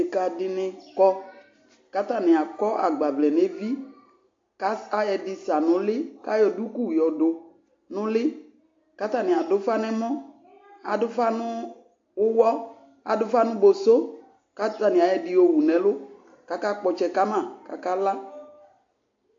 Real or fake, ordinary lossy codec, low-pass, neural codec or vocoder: real; AAC, 48 kbps; 7.2 kHz; none